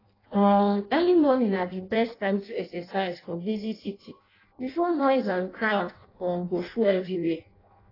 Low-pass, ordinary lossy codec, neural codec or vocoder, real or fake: 5.4 kHz; AAC, 24 kbps; codec, 16 kHz in and 24 kHz out, 0.6 kbps, FireRedTTS-2 codec; fake